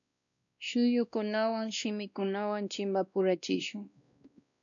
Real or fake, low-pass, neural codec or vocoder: fake; 7.2 kHz; codec, 16 kHz, 1 kbps, X-Codec, WavLM features, trained on Multilingual LibriSpeech